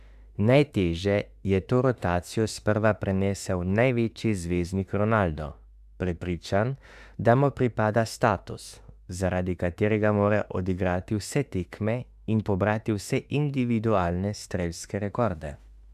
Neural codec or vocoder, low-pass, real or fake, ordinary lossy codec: autoencoder, 48 kHz, 32 numbers a frame, DAC-VAE, trained on Japanese speech; 14.4 kHz; fake; Opus, 64 kbps